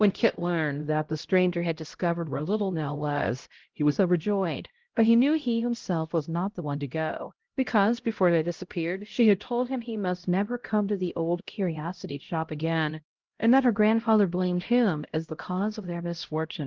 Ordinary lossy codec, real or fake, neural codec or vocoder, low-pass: Opus, 16 kbps; fake; codec, 16 kHz, 0.5 kbps, X-Codec, HuBERT features, trained on LibriSpeech; 7.2 kHz